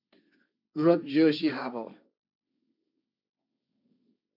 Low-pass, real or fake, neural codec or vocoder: 5.4 kHz; fake; codec, 16 kHz, 1.1 kbps, Voila-Tokenizer